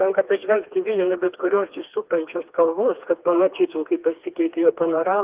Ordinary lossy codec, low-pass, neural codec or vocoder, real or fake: Opus, 32 kbps; 3.6 kHz; codec, 16 kHz, 2 kbps, FreqCodec, smaller model; fake